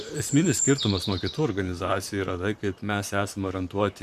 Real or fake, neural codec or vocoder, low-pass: fake; vocoder, 44.1 kHz, 128 mel bands, Pupu-Vocoder; 14.4 kHz